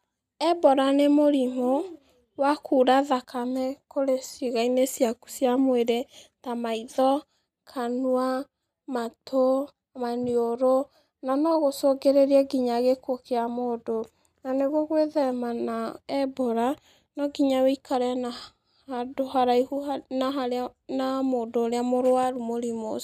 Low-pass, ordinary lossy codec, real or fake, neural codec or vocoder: 14.4 kHz; none; real; none